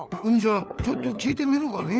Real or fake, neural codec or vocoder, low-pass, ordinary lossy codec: fake; codec, 16 kHz, 4 kbps, FunCodec, trained on LibriTTS, 50 frames a second; none; none